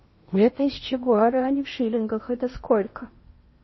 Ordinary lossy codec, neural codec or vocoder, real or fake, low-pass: MP3, 24 kbps; codec, 16 kHz in and 24 kHz out, 0.8 kbps, FocalCodec, streaming, 65536 codes; fake; 7.2 kHz